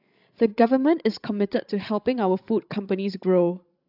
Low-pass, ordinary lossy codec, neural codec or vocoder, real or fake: 5.4 kHz; AAC, 48 kbps; codec, 16 kHz, 16 kbps, FreqCodec, larger model; fake